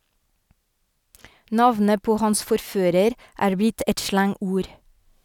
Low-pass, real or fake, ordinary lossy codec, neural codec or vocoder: 19.8 kHz; real; none; none